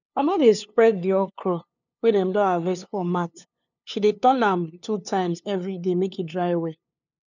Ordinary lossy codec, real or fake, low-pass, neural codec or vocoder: none; fake; 7.2 kHz; codec, 16 kHz, 2 kbps, FunCodec, trained on LibriTTS, 25 frames a second